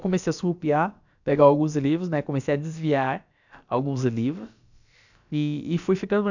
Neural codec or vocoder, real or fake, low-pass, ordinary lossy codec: codec, 16 kHz, about 1 kbps, DyCAST, with the encoder's durations; fake; 7.2 kHz; none